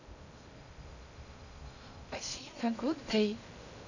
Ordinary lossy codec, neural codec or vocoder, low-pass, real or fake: none; codec, 16 kHz in and 24 kHz out, 0.6 kbps, FocalCodec, streaming, 2048 codes; 7.2 kHz; fake